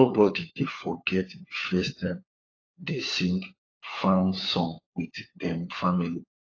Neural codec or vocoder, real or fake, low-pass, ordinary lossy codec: codec, 16 kHz, 4 kbps, FunCodec, trained on LibriTTS, 50 frames a second; fake; 7.2 kHz; AAC, 32 kbps